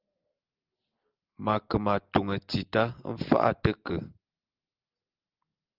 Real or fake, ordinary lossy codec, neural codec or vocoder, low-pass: real; Opus, 32 kbps; none; 5.4 kHz